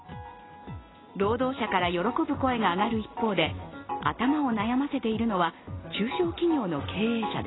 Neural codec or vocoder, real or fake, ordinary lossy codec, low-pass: none; real; AAC, 16 kbps; 7.2 kHz